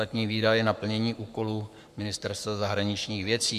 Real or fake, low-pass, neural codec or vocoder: fake; 14.4 kHz; codec, 44.1 kHz, 7.8 kbps, Pupu-Codec